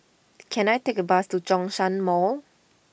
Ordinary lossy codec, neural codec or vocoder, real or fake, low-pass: none; none; real; none